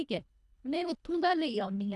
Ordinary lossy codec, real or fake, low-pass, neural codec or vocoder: none; fake; none; codec, 24 kHz, 1.5 kbps, HILCodec